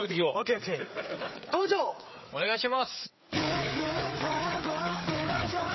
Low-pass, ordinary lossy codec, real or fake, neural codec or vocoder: 7.2 kHz; MP3, 24 kbps; fake; codec, 16 kHz, 4 kbps, FreqCodec, larger model